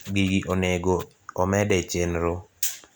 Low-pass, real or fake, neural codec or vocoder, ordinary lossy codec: none; real; none; none